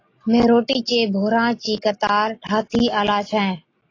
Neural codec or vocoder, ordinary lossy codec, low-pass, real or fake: none; AAC, 32 kbps; 7.2 kHz; real